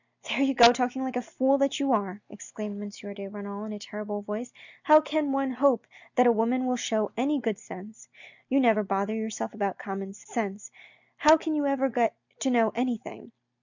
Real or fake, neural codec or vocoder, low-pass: real; none; 7.2 kHz